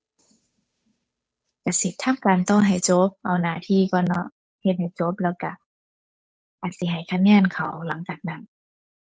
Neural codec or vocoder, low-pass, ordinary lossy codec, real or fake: codec, 16 kHz, 8 kbps, FunCodec, trained on Chinese and English, 25 frames a second; none; none; fake